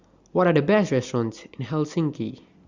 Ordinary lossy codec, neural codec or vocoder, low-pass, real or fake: Opus, 64 kbps; none; 7.2 kHz; real